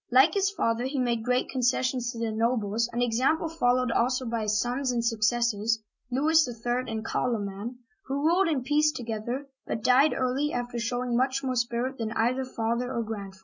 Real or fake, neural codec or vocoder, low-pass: real; none; 7.2 kHz